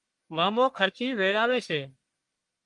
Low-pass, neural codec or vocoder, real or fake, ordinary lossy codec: 10.8 kHz; codec, 44.1 kHz, 1.7 kbps, Pupu-Codec; fake; Opus, 32 kbps